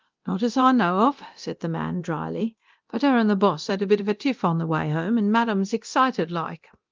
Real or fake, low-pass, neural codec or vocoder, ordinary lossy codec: fake; 7.2 kHz; codec, 24 kHz, 0.9 kbps, DualCodec; Opus, 32 kbps